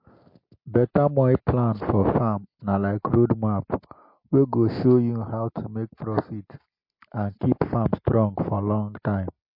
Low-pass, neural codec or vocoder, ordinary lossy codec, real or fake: 5.4 kHz; none; MP3, 32 kbps; real